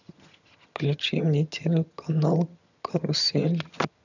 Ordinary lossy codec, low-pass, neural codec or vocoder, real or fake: none; 7.2 kHz; vocoder, 22.05 kHz, 80 mel bands, HiFi-GAN; fake